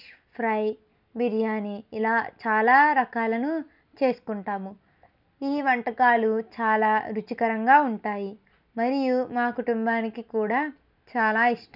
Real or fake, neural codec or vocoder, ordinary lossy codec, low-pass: real; none; none; 5.4 kHz